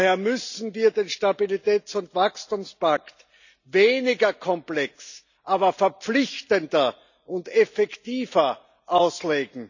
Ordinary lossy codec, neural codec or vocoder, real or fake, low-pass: none; none; real; 7.2 kHz